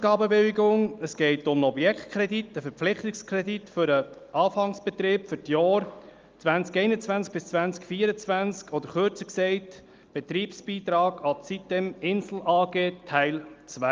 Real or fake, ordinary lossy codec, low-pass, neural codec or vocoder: real; Opus, 24 kbps; 7.2 kHz; none